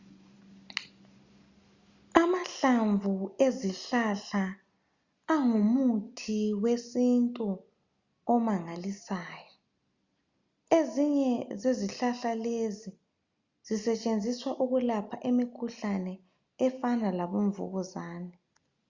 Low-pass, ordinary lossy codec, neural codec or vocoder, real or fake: 7.2 kHz; Opus, 64 kbps; none; real